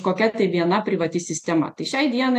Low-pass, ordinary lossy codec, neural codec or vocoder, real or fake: 14.4 kHz; AAC, 64 kbps; none; real